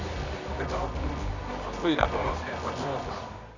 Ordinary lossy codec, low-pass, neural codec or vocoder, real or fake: Opus, 64 kbps; 7.2 kHz; codec, 24 kHz, 0.9 kbps, WavTokenizer, medium music audio release; fake